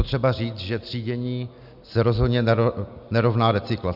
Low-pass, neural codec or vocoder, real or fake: 5.4 kHz; none; real